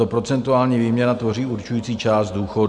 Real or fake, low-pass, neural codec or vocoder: real; 10.8 kHz; none